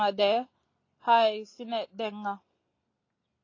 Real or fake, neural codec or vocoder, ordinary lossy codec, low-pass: real; none; AAC, 32 kbps; 7.2 kHz